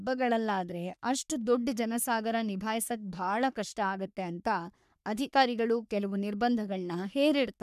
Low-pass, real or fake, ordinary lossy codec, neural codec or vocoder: 14.4 kHz; fake; none; codec, 44.1 kHz, 3.4 kbps, Pupu-Codec